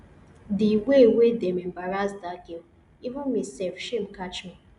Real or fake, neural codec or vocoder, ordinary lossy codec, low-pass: real; none; none; 10.8 kHz